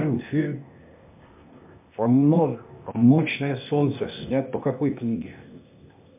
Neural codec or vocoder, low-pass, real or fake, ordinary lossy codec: codec, 16 kHz, 0.8 kbps, ZipCodec; 3.6 kHz; fake; MP3, 32 kbps